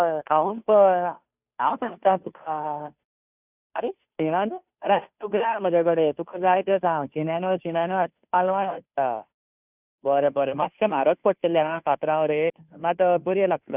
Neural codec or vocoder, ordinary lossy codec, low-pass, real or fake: codec, 16 kHz, 2 kbps, FunCodec, trained on Chinese and English, 25 frames a second; none; 3.6 kHz; fake